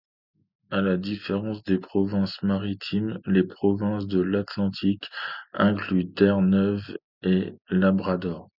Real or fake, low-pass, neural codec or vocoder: real; 5.4 kHz; none